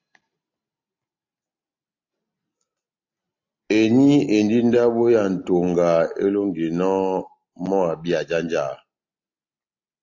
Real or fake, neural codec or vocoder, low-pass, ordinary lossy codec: real; none; 7.2 kHz; AAC, 48 kbps